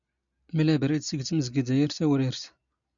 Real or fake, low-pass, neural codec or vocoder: real; 7.2 kHz; none